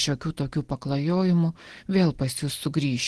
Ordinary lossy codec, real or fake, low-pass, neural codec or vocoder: Opus, 16 kbps; real; 10.8 kHz; none